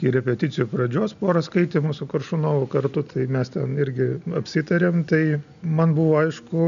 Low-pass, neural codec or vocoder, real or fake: 7.2 kHz; none; real